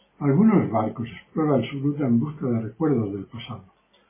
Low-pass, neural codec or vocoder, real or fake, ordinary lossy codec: 3.6 kHz; none; real; MP3, 16 kbps